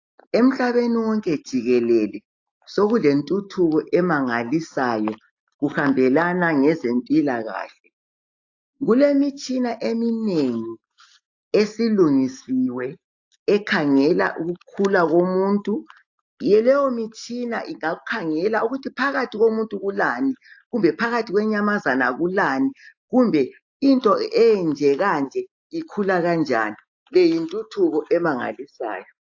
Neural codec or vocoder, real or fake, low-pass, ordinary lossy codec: none; real; 7.2 kHz; AAC, 48 kbps